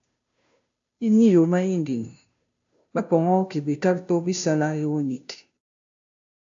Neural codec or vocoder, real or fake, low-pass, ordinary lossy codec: codec, 16 kHz, 0.5 kbps, FunCodec, trained on Chinese and English, 25 frames a second; fake; 7.2 kHz; AAC, 64 kbps